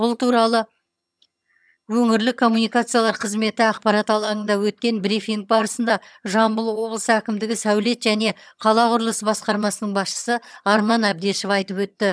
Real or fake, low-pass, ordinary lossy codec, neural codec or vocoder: fake; none; none; vocoder, 22.05 kHz, 80 mel bands, HiFi-GAN